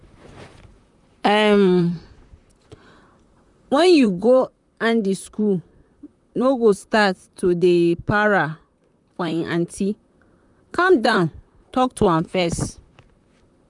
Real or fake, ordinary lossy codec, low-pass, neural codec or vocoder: fake; none; 10.8 kHz; vocoder, 44.1 kHz, 128 mel bands, Pupu-Vocoder